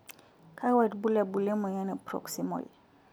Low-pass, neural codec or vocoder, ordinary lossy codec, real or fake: none; none; none; real